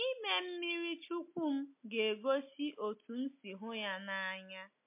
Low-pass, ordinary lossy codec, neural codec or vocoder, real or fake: 3.6 kHz; MP3, 24 kbps; none; real